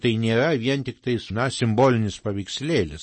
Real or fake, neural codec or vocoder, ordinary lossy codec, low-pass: real; none; MP3, 32 kbps; 9.9 kHz